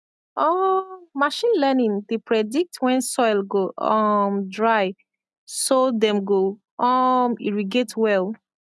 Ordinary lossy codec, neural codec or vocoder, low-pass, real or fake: none; none; none; real